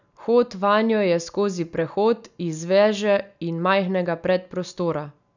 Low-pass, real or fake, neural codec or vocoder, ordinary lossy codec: 7.2 kHz; real; none; none